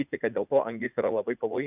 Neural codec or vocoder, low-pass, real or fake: vocoder, 44.1 kHz, 80 mel bands, Vocos; 3.6 kHz; fake